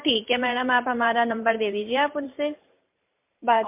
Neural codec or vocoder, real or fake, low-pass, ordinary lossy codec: vocoder, 44.1 kHz, 128 mel bands every 512 samples, BigVGAN v2; fake; 3.6 kHz; MP3, 32 kbps